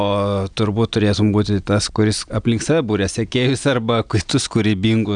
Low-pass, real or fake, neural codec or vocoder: 9.9 kHz; real; none